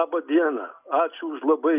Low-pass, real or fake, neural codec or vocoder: 3.6 kHz; real; none